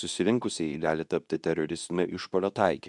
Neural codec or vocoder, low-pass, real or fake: codec, 24 kHz, 0.9 kbps, WavTokenizer, medium speech release version 2; 10.8 kHz; fake